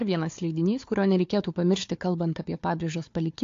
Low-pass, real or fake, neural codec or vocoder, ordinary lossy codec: 7.2 kHz; fake; codec, 16 kHz, 4 kbps, FunCodec, trained on Chinese and English, 50 frames a second; AAC, 48 kbps